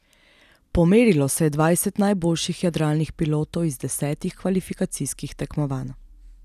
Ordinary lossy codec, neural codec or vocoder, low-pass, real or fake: none; none; 14.4 kHz; real